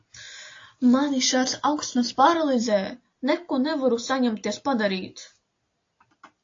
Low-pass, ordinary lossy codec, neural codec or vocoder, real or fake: 7.2 kHz; AAC, 48 kbps; none; real